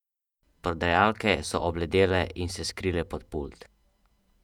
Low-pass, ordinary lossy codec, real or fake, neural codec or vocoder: 19.8 kHz; none; fake; vocoder, 48 kHz, 128 mel bands, Vocos